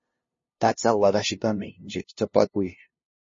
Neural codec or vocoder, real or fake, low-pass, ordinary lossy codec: codec, 16 kHz, 0.5 kbps, FunCodec, trained on LibriTTS, 25 frames a second; fake; 7.2 kHz; MP3, 32 kbps